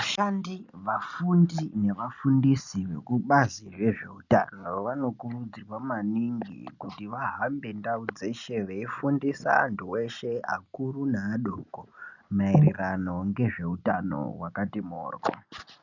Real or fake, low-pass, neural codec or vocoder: real; 7.2 kHz; none